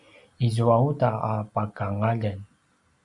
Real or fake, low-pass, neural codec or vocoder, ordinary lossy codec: real; 10.8 kHz; none; MP3, 64 kbps